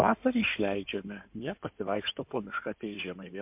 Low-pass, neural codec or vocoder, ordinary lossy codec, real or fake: 3.6 kHz; codec, 16 kHz in and 24 kHz out, 2.2 kbps, FireRedTTS-2 codec; MP3, 32 kbps; fake